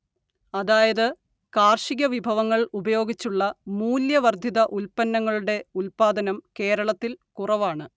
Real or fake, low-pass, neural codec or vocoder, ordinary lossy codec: real; none; none; none